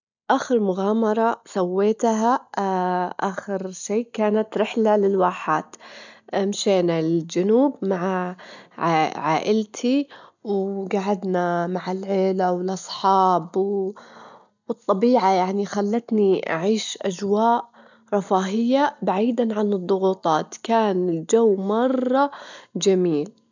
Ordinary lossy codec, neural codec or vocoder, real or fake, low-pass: none; none; real; 7.2 kHz